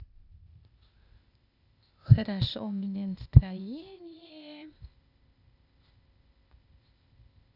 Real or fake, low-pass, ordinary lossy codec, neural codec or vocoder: fake; 5.4 kHz; none; codec, 16 kHz, 0.8 kbps, ZipCodec